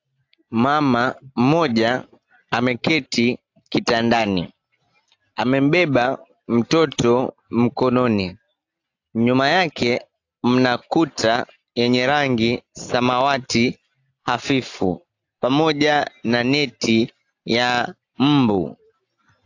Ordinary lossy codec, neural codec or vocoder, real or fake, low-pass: AAC, 48 kbps; none; real; 7.2 kHz